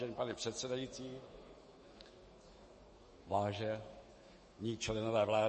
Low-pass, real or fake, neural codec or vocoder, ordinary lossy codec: 10.8 kHz; fake; autoencoder, 48 kHz, 128 numbers a frame, DAC-VAE, trained on Japanese speech; MP3, 32 kbps